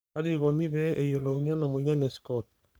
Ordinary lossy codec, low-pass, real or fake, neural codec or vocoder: none; none; fake; codec, 44.1 kHz, 3.4 kbps, Pupu-Codec